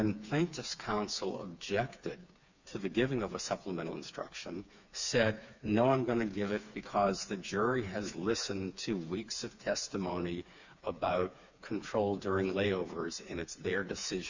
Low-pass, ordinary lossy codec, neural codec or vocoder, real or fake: 7.2 kHz; Opus, 64 kbps; codec, 16 kHz, 4 kbps, FreqCodec, smaller model; fake